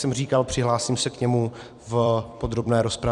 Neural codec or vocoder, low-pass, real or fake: vocoder, 44.1 kHz, 128 mel bands every 256 samples, BigVGAN v2; 10.8 kHz; fake